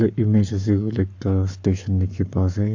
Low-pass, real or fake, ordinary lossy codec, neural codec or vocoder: 7.2 kHz; fake; AAC, 48 kbps; codec, 44.1 kHz, 7.8 kbps, Pupu-Codec